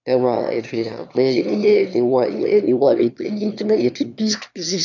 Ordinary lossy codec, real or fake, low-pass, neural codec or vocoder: none; fake; 7.2 kHz; autoencoder, 22.05 kHz, a latent of 192 numbers a frame, VITS, trained on one speaker